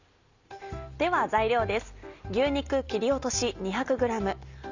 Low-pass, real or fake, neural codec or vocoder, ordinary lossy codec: 7.2 kHz; real; none; Opus, 64 kbps